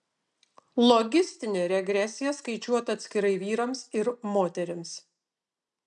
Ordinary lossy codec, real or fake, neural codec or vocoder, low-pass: MP3, 96 kbps; real; none; 10.8 kHz